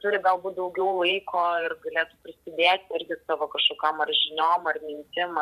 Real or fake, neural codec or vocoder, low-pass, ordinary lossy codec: fake; vocoder, 44.1 kHz, 128 mel bands every 512 samples, BigVGAN v2; 14.4 kHz; AAC, 96 kbps